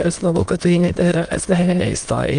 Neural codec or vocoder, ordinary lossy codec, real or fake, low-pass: autoencoder, 22.05 kHz, a latent of 192 numbers a frame, VITS, trained on many speakers; Opus, 32 kbps; fake; 9.9 kHz